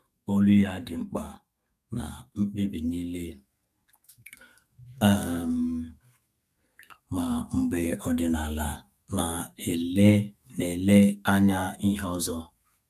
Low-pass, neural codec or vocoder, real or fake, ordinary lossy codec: 14.4 kHz; codec, 32 kHz, 1.9 kbps, SNAC; fake; none